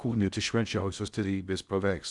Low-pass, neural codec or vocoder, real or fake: 10.8 kHz; codec, 16 kHz in and 24 kHz out, 0.6 kbps, FocalCodec, streaming, 2048 codes; fake